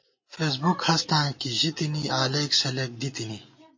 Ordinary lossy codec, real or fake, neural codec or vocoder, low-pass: MP3, 32 kbps; real; none; 7.2 kHz